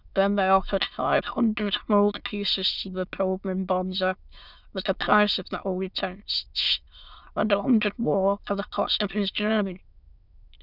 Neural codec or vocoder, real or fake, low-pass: autoencoder, 22.05 kHz, a latent of 192 numbers a frame, VITS, trained on many speakers; fake; 5.4 kHz